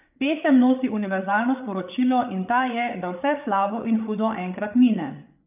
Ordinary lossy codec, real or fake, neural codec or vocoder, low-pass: none; fake; codec, 16 kHz, 8 kbps, FreqCodec, larger model; 3.6 kHz